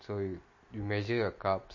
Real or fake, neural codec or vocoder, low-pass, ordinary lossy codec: real; none; 7.2 kHz; MP3, 32 kbps